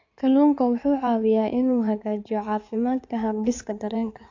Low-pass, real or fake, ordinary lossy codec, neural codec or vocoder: 7.2 kHz; fake; AAC, 48 kbps; codec, 16 kHz in and 24 kHz out, 2.2 kbps, FireRedTTS-2 codec